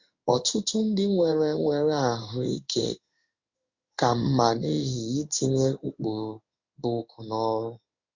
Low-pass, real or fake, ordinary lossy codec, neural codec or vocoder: 7.2 kHz; fake; Opus, 64 kbps; codec, 16 kHz in and 24 kHz out, 1 kbps, XY-Tokenizer